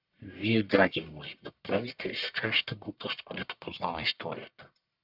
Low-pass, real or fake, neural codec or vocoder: 5.4 kHz; fake; codec, 44.1 kHz, 1.7 kbps, Pupu-Codec